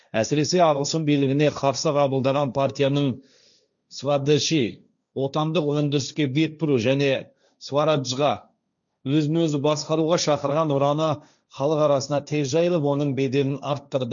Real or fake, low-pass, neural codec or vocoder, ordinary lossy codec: fake; 7.2 kHz; codec, 16 kHz, 1.1 kbps, Voila-Tokenizer; none